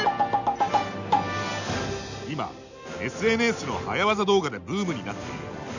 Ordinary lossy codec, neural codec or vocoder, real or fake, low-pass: none; none; real; 7.2 kHz